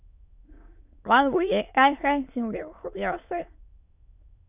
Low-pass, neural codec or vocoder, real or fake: 3.6 kHz; autoencoder, 22.05 kHz, a latent of 192 numbers a frame, VITS, trained on many speakers; fake